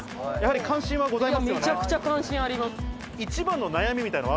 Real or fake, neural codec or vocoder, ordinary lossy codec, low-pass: real; none; none; none